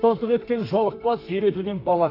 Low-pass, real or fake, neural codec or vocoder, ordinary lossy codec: 5.4 kHz; fake; codec, 32 kHz, 1.9 kbps, SNAC; AAC, 32 kbps